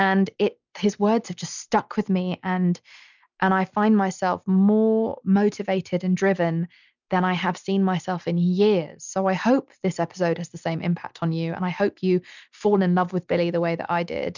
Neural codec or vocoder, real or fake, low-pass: none; real; 7.2 kHz